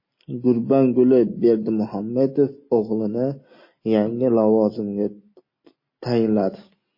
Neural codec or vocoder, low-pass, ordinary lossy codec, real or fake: none; 5.4 kHz; MP3, 24 kbps; real